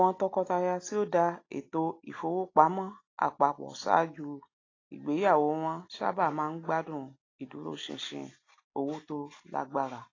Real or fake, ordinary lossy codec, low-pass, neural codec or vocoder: real; AAC, 32 kbps; 7.2 kHz; none